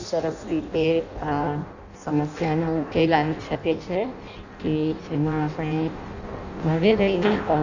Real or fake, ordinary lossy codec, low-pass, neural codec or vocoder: fake; none; 7.2 kHz; codec, 16 kHz in and 24 kHz out, 0.6 kbps, FireRedTTS-2 codec